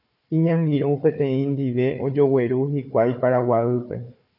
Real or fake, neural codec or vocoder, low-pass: fake; codec, 16 kHz, 4 kbps, FunCodec, trained on Chinese and English, 50 frames a second; 5.4 kHz